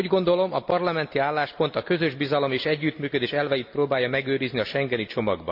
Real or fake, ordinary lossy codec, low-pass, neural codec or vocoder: real; AAC, 48 kbps; 5.4 kHz; none